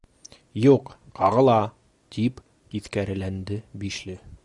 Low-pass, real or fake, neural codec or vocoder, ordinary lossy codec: 10.8 kHz; real; none; Opus, 64 kbps